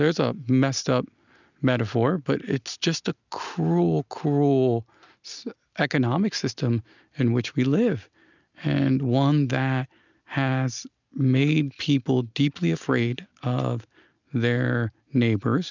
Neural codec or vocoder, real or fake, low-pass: none; real; 7.2 kHz